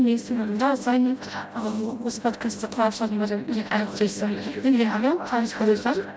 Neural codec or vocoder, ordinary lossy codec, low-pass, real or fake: codec, 16 kHz, 0.5 kbps, FreqCodec, smaller model; none; none; fake